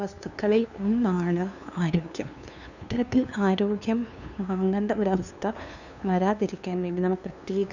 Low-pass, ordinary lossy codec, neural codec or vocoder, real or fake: 7.2 kHz; none; codec, 16 kHz, 2 kbps, FunCodec, trained on LibriTTS, 25 frames a second; fake